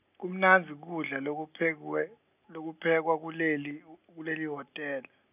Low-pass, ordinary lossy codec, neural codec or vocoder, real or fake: 3.6 kHz; none; none; real